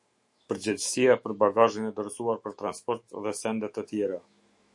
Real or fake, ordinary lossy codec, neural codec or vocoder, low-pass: fake; MP3, 48 kbps; autoencoder, 48 kHz, 128 numbers a frame, DAC-VAE, trained on Japanese speech; 10.8 kHz